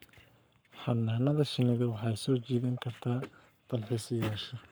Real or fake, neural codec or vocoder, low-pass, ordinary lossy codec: fake; codec, 44.1 kHz, 7.8 kbps, Pupu-Codec; none; none